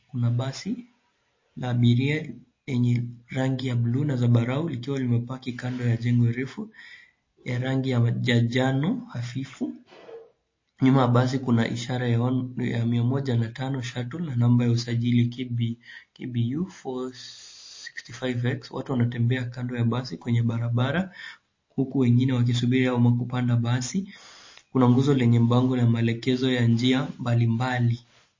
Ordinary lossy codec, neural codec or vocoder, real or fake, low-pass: MP3, 32 kbps; none; real; 7.2 kHz